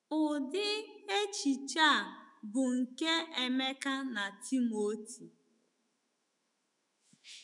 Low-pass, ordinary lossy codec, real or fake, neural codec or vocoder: 10.8 kHz; none; fake; autoencoder, 48 kHz, 128 numbers a frame, DAC-VAE, trained on Japanese speech